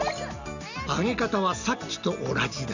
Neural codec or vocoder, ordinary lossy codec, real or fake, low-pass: none; none; real; 7.2 kHz